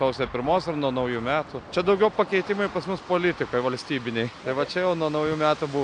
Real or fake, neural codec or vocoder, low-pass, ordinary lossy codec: real; none; 9.9 kHz; AAC, 64 kbps